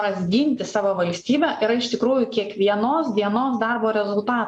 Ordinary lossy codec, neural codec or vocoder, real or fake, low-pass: AAC, 64 kbps; none; real; 10.8 kHz